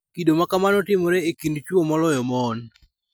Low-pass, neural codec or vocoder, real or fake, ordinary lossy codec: none; none; real; none